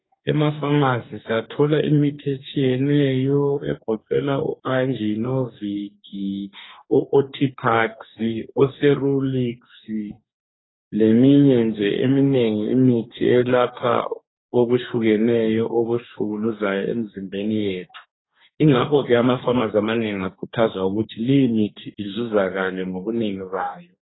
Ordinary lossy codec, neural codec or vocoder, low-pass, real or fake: AAC, 16 kbps; codec, 44.1 kHz, 2.6 kbps, DAC; 7.2 kHz; fake